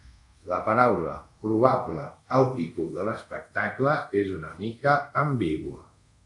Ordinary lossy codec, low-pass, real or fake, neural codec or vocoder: MP3, 96 kbps; 10.8 kHz; fake; codec, 24 kHz, 0.5 kbps, DualCodec